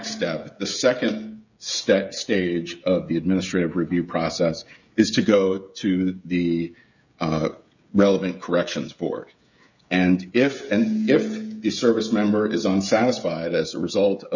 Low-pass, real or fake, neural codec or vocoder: 7.2 kHz; fake; codec, 16 kHz, 16 kbps, FreqCodec, smaller model